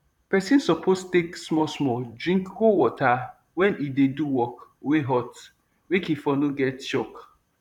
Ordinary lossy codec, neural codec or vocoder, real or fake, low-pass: none; vocoder, 44.1 kHz, 128 mel bands, Pupu-Vocoder; fake; 19.8 kHz